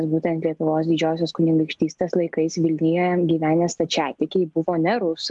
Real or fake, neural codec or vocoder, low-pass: real; none; 10.8 kHz